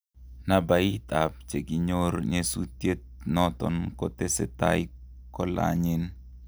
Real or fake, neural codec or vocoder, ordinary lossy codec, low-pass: real; none; none; none